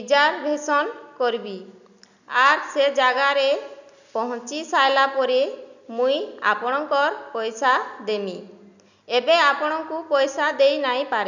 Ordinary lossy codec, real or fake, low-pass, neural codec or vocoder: none; real; 7.2 kHz; none